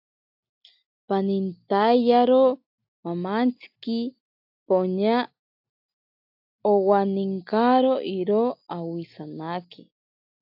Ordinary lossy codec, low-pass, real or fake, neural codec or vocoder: AAC, 48 kbps; 5.4 kHz; real; none